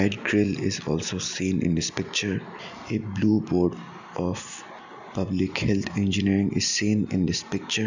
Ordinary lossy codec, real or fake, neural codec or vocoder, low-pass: none; real; none; 7.2 kHz